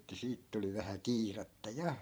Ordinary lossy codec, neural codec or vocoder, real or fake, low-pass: none; vocoder, 44.1 kHz, 128 mel bands every 512 samples, BigVGAN v2; fake; none